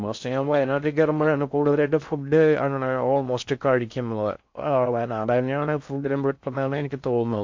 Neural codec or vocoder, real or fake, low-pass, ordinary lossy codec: codec, 16 kHz in and 24 kHz out, 0.6 kbps, FocalCodec, streaming, 2048 codes; fake; 7.2 kHz; MP3, 48 kbps